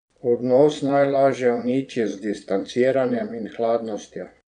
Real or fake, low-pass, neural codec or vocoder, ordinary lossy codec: fake; 9.9 kHz; vocoder, 22.05 kHz, 80 mel bands, Vocos; none